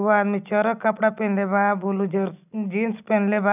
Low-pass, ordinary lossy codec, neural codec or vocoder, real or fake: 3.6 kHz; none; none; real